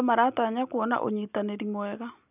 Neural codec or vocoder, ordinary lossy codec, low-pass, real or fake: none; none; 3.6 kHz; real